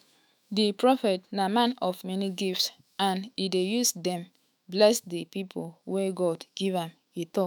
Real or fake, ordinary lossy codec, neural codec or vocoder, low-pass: fake; none; autoencoder, 48 kHz, 128 numbers a frame, DAC-VAE, trained on Japanese speech; none